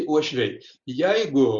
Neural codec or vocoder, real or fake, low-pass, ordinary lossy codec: none; real; 7.2 kHz; Opus, 64 kbps